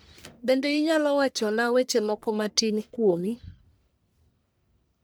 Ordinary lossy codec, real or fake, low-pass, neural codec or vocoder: none; fake; none; codec, 44.1 kHz, 1.7 kbps, Pupu-Codec